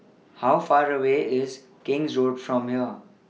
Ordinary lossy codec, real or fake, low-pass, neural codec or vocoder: none; real; none; none